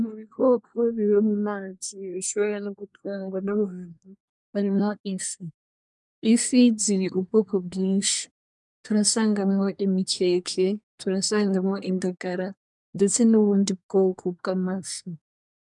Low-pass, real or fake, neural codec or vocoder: 10.8 kHz; fake; codec, 24 kHz, 1 kbps, SNAC